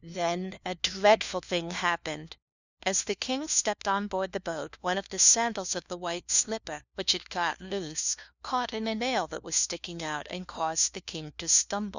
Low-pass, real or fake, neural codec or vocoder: 7.2 kHz; fake; codec, 16 kHz, 1 kbps, FunCodec, trained on LibriTTS, 50 frames a second